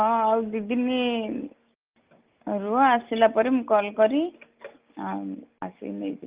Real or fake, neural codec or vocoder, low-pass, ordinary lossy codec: real; none; 3.6 kHz; Opus, 32 kbps